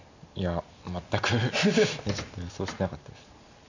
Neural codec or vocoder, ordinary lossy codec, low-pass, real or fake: none; none; 7.2 kHz; real